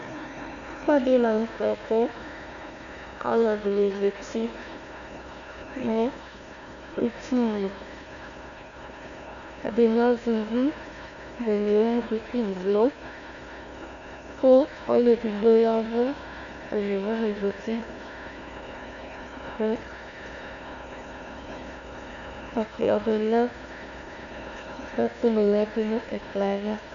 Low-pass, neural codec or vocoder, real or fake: 7.2 kHz; codec, 16 kHz, 1 kbps, FunCodec, trained on Chinese and English, 50 frames a second; fake